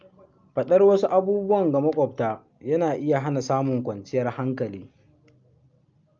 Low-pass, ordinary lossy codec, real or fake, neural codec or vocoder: 7.2 kHz; Opus, 24 kbps; real; none